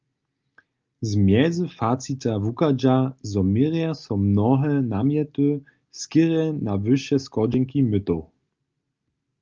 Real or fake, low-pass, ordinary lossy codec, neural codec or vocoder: real; 7.2 kHz; Opus, 24 kbps; none